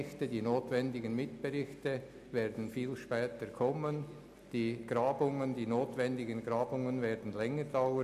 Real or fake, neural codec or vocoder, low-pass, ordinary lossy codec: real; none; 14.4 kHz; none